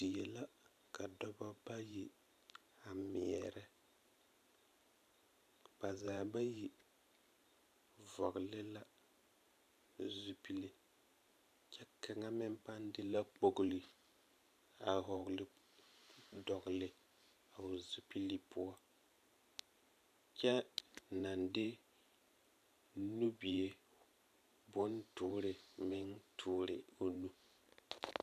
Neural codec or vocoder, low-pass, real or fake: vocoder, 44.1 kHz, 128 mel bands every 512 samples, BigVGAN v2; 14.4 kHz; fake